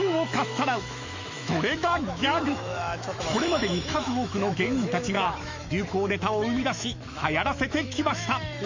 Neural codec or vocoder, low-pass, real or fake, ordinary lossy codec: autoencoder, 48 kHz, 128 numbers a frame, DAC-VAE, trained on Japanese speech; 7.2 kHz; fake; MP3, 48 kbps